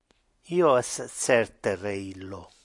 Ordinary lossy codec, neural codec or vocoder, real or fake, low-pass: AAC, 64 kbps; none; real; 10.8 kHz